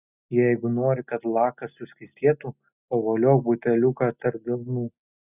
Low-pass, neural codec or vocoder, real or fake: 3.6 kHz; none; real